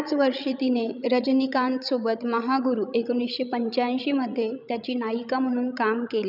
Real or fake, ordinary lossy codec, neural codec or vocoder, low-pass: fake; none; codec, 16 kHz, 16 kbps, FreqCodec, larger model; 5.4 kHz